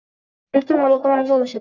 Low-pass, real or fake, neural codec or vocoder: 7.2 kHz; fake; codec, 44.1 kHz, 1.7 kbps, Pupu-Codec